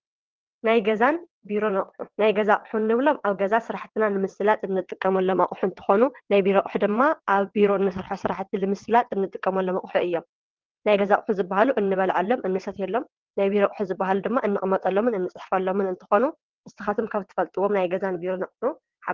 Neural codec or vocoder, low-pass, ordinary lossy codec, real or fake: vocoder, 22.05 kHz, 80 mel bands, WaveNeXt; 7.2 kHz; Opus, 16 kbps; fake